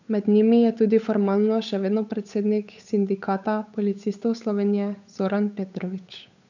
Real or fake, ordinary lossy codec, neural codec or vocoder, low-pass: fake; none; codec, 16 kHz, 8 kbps, FunCodec, trained on Chinese and English, 25 frames a second; 7.2 kHz